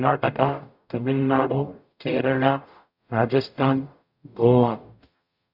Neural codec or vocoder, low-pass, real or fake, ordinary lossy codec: codec, 44.1 kHz, 0.9 kbps, DAC; 5.4 kHz; fake; none